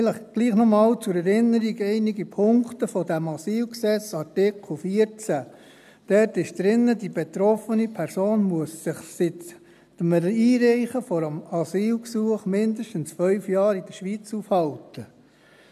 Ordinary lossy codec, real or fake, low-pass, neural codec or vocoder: none; real; 14.4 kHz; none